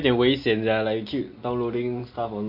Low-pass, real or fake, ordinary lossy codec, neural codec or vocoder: 5.4 kHz; real; AAC, 32 kbps; none